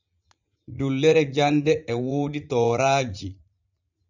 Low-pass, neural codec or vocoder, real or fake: 7.2 kHz; vocoder, 44.1 kHz, 80 mel bands, Vocos; fake